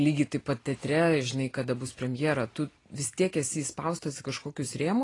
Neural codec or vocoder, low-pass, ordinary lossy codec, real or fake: none; 10.8 kHz; AAC, 32 kbps; real